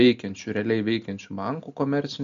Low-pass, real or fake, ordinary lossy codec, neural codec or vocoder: 7.2 kHz; real; MP3, 64 kbps; none